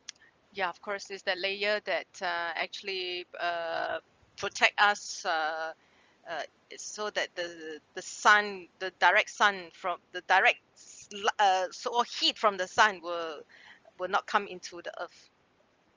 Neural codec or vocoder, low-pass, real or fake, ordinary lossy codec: none; 7.2 kHz; real; Opus, 32 kbps